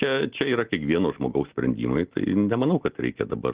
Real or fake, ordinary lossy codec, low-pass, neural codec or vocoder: real; Opus, 16 kbps; 3.6 kHz; none